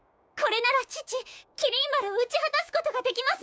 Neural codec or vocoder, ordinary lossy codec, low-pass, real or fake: codec, 16 kHz, 6 kbps, DAC; none; none; fake